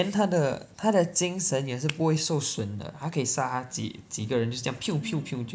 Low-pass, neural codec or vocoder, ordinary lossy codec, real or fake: none; none; none; real